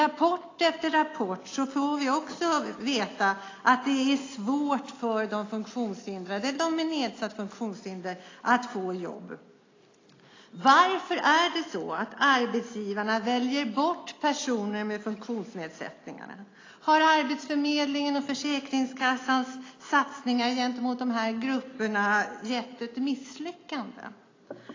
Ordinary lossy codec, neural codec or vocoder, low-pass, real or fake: AAC, 32 kbps; none; 7.2 kHz; real